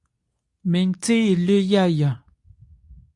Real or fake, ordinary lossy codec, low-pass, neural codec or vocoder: fake; AAC, 64 kbps; 10.8 kHz; codec, 24 kHz, 0.9 kbps, WavTokenizer, medium speech release version 2